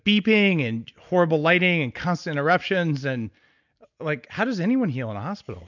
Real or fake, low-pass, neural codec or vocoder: real; 7.2 kHz; none